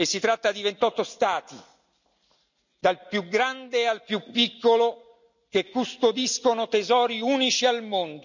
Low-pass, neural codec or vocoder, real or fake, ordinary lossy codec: 7.2 kHz; none; real; none